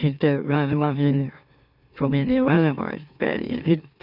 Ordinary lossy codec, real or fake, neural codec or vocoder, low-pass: none; fake; autoencoder, 44.1 kHz, a latent of 192 numbers a frame, MeloTTS; 5.4 kHz